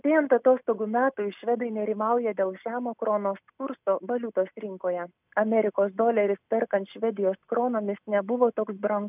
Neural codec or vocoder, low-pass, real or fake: none; 3.6 kHz; real